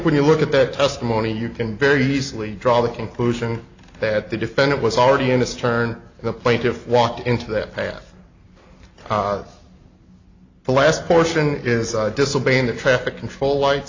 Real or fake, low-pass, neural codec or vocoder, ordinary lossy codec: real; 7.2 kHz; none; AAC, 48 kbps